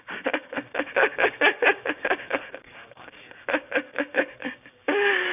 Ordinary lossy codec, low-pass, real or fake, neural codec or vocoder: none; 3.6 kHz; fake; codec, 16 kHz, 6 kbps, DAC